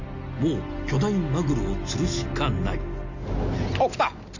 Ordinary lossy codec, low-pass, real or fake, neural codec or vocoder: none; 7.2 kHz; real; none